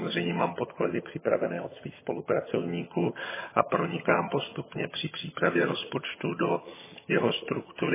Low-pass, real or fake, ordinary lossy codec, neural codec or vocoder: 3.6 kHz; fake; MP3, 16 kbps; vocoder, 22.05 kHz, 80 mel bands, HiFi-GAN